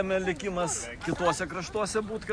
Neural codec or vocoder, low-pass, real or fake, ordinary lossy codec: none; 9.9 kHz; real; Opus, 64 kbps